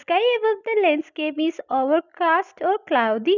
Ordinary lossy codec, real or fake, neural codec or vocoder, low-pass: none; real; none; 7.2 kHz